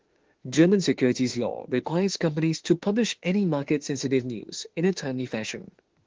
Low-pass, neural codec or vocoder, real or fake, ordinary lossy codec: 7.2 kHz; autoencoder, 48 kHz, 32 numbers a frame, DAC-VAE, trained on Japanese speech; fake; Opus, 16 kbps